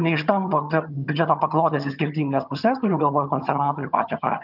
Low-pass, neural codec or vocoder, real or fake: 5.4 kHz; vocoder, 22.05 kHz, 80 mel bands, HiFi-GAN; fake